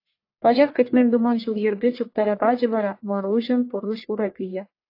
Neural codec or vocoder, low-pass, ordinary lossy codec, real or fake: codec, 44.1 kHz, 1.7 kbps, Pupu-Codec; 5.4 kHz; AAC, 32 kbps; fake